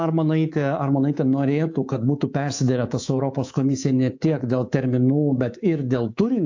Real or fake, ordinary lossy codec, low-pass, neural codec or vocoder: fake; AAC, 48 kbps; 7.2 kHz; codec, 24 kHz, 3.1 kbps, DualCodec